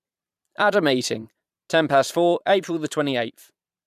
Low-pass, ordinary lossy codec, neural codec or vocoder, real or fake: 14.4 kHz; none; vocoder, 44.1 kHz, 128 mel bands every 512 samples, BigVGAN v2; fake